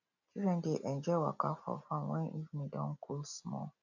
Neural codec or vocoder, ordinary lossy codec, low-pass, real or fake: none; none; 7.2 kHz; real